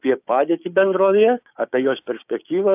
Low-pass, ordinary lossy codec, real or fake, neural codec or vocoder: 3.6 kHz; AAC, 32 kbps; fake; codec, 16 kHz, 6 kbps, DAC